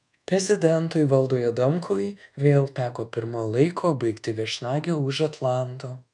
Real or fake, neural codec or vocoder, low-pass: fake; codec, 24 kHz, 1.2 kbps, DualCodec; 10.8 kHz